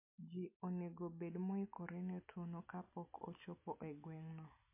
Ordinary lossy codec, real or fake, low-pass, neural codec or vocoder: MP3, 24 kbps; real; 3.6 kHz; none